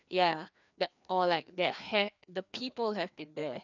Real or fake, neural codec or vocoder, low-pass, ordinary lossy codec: fake; codec, 16 kHz, 2 kbps, FreqCodec, larger model; 7.2 kHz; none